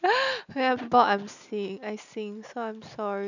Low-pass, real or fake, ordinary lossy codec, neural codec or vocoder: 7.2 kHz; real; none; none